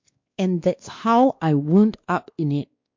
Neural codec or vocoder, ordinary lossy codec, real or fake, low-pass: codec, 16 kHz, 2 kbps, X-Codec, WavLM features, trained on Multilingual LibriSpeech; MP3, 48 kbps; fake; 7.2 kHz